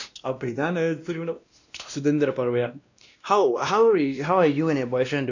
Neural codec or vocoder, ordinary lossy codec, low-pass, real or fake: codec, 16 kHz, 1 kbps, X-Codec, WavLM features, trained on Multilingual LibriSpeech; none; 7.2 kHz; fake